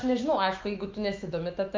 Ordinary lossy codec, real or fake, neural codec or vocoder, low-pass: Opus, 32 kbps; fake; autoencoder, 48 kHz, 128 numbers a frame, DAC-VAE, trained on Japanese speech; 7.2 kHz